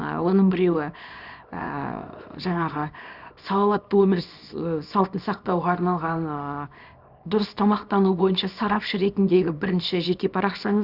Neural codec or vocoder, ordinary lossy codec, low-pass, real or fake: codec, 24 kHz, 0.9 kbps, WavTokenizer, small release; none; 5.4 kHz; fake